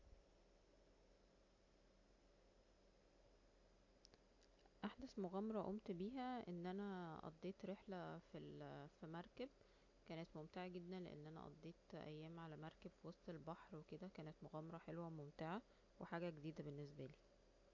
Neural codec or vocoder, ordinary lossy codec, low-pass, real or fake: none; AAC, 48 kbps; 7.2 kHz; real